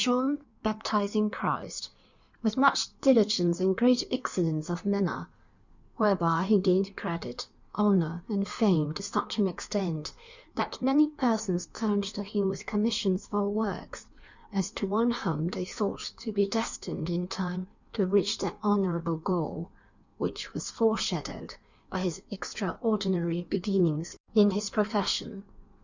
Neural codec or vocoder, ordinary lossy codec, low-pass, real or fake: codec, 16 kHz in and 24 kHz out, 1.1 kbps, FireRedTTS-2 codec; Opus, 64 kbps; 7.2 kHz; fake